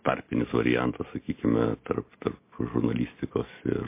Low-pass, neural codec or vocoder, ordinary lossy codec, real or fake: 3.6 kHz; none; MP3, 24 kbps; real